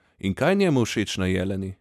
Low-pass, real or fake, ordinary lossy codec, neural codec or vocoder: 14.4 kHz; real; none; none